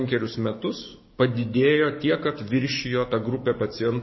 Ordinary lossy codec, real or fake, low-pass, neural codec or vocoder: MP3, 24 kbps; fake; 7.2 kHz; codec, 44.1 kHz, 7.8 kbps, DAC